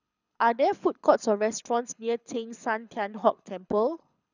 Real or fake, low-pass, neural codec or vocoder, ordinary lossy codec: fake; 7.2 kHz; codec, 24 kHz, 6 kbps, HILCodec; none